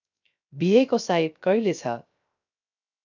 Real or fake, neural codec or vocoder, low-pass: fake; codec, 16 kHz, 0.3 kbps, FocalCodec; 7.2 kHz